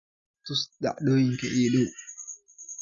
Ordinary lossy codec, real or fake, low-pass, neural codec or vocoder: none; real; 7.2 kHz; none